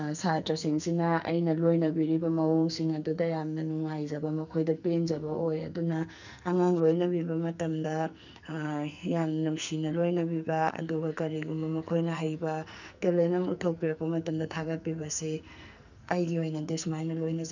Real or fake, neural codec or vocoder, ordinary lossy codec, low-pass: fake; codec, 44.1 kHz, 2.6 kbps, SNAC; none; 7.2 kHz